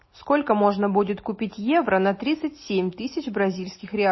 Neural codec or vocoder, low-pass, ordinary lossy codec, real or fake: none; 7.2 kHz; MP3, 24 kbps; real